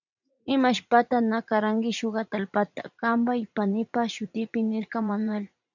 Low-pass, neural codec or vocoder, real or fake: 7.2 kHz; vocoder, 44.1 kHz, 80 mel bands, Vocos; fake